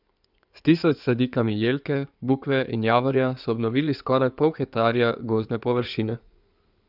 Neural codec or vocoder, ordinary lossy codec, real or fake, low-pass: codec, 16 kHz in and 24 kHz out, 2.2 kbps, FireRedTTS-2 codec; none; fake; 5.4 kHz